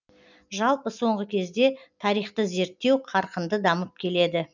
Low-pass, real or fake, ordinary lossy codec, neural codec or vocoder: 7.2 kHz; real; none; none